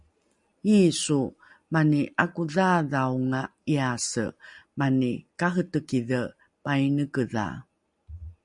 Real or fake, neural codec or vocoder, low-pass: real; none; 10.8 kHz